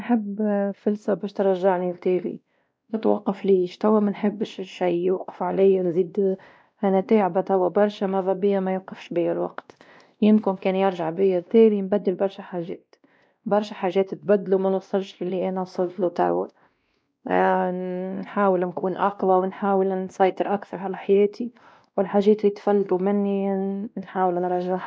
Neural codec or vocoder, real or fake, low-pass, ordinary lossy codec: codec, 16 kHz, 1 kbps, X-Codec, WavLM features, trained on Multilingual LibriSpeech; fake; none; none